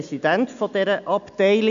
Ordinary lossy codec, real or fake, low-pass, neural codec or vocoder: none; real; 7.2 kHz; none